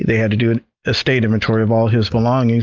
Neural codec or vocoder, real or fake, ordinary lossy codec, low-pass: none; real; Opus, 24 kbps; 7.2 kHz